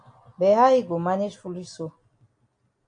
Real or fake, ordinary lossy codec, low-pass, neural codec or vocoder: real; MP3, 96 kbps; 9.9 kHz; none